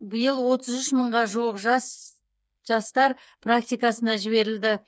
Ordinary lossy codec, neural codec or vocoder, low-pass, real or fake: none; codec, 16 kHz, 4 kbps, FreqCodec, smaller model; none; fake